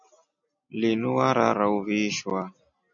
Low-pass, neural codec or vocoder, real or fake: 7.2 kHz; none; real